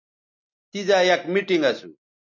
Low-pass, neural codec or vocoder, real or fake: 7.2 kHz; none; real